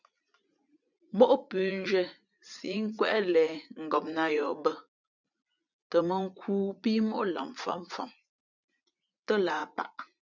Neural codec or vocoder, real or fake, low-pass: vocoder, 44.1 kHz, 80 mel bands, Vocos; fake; 7.2 kHz